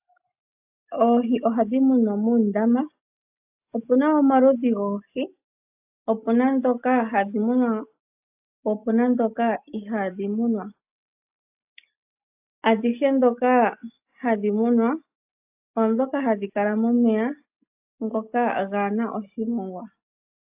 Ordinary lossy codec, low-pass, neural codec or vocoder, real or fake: AAC, 32 kbps; 3.6 kHz; none; real